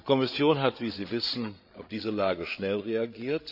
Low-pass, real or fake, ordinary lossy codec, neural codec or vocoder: 5.4 kHz; fake; none; codec, 16 kHz, 8 kbps, FreqCodec, larger model